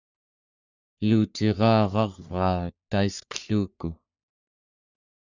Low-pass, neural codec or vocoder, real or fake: 7.2 kHz; codec, 44.1 kHz, 3.4 kbps, Pupu-Codec; fake